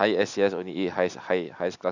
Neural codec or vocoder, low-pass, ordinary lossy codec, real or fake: none; 7.2 kHz; none; real